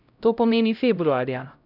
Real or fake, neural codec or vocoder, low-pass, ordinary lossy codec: fake; codec, 16 kHz, 0.5 kbps, X-Codec, HuBERT features, trained on LibriSpeech; 5.4 kHz; none